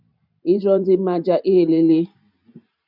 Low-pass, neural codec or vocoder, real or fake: 5.4 kHz; vocoder, 44.1 kHz, 128 mel bands every 512 samples, BigVGAN v2; fake